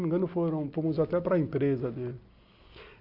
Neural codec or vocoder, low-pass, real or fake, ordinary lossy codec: none; 5.4 kHz; real; Opus, 64 kbps